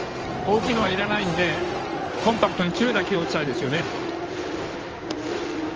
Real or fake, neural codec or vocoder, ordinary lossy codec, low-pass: fake; codec, 16 kHz in and 24 kHz out, 2.2 kbps, FireRedTTS-2 codec; Opus, 24 kbps; 7.2 kHz